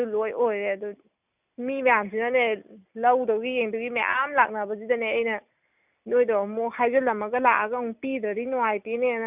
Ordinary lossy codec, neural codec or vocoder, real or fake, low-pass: none; none; real; 3.6 kHz